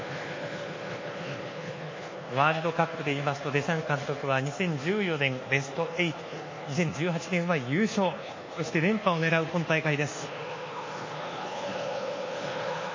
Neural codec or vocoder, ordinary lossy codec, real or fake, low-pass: codec, 24 kHz, 1.2 kbps, DualCodec; MP3, 32 kbps; fake; 7.2 kHz